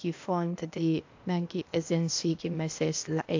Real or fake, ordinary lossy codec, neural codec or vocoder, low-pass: fake; AAC, 48 kbps; codec, 16 kHz, 0.8 kbps, ZipCodec; 7.2 kHz